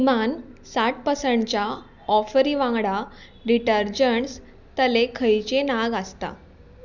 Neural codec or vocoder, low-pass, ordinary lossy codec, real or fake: none; 7.2 kHz; none; real